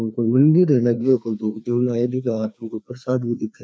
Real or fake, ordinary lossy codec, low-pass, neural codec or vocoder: fake; none; none; codec, 16 kHz, 2 kbps, FreqCodec, larger model